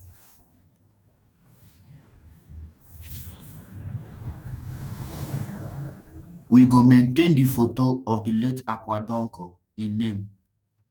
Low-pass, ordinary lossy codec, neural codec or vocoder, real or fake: 19.8 kHz; none; codec, 44.1 kHz, 2.6 kbps, DAC; fake